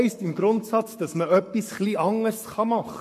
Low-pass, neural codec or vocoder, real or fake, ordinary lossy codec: 14.4 kHz; codec, 44.1 kHz, 7.8 kbps, Pupu-Codec; fake; MP3, 64 kbps